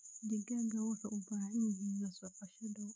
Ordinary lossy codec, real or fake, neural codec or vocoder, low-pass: none; fake; codec, 16 kHz, 16 kbps, FreqCodec, smaller model; none